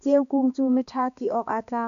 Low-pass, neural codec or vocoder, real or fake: 7.2 kHz; codec, 16 kHz, 2 kbps, X-Codec, HuBERT features, trained on balanced general audio; fake